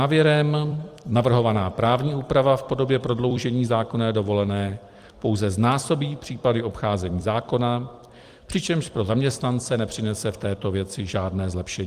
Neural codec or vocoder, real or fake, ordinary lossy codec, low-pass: vocoder, 44.1 kHz, 128 mel bands every 256 samples, BigVGAN v2; fake; Opus, 24 kbps; 14.4 kHz